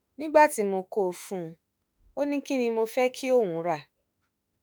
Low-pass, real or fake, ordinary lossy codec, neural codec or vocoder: none; fake; none; autoencoder, 48 kHz, 32 numbers a frame, DAC-VAE, trained on Japanese speech